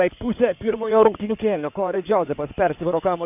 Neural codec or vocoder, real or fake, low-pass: codec, 16 kHz in and 24 kHz out, 2.2 kbps, FireRedTTS-2 codec; fake; 3.6 kHz